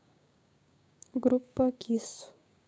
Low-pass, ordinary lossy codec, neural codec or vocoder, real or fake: none; none; codec, 16 kHz, 6 kbps, DAC; fake